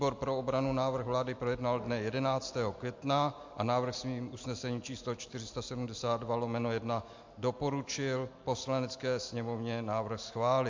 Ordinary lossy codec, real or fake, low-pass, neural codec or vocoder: MP3, 48 kbps; real; 7.2 kHz; none